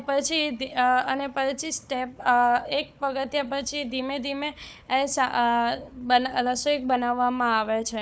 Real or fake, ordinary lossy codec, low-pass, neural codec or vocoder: fake; none; none; codec, 16 kHz, 4 kbps, FunCodec, trained on Chinese and English, 50 frames a second